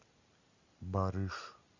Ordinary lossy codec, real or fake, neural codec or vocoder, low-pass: Opus, 64 kbps; real; none; 7.2 kHz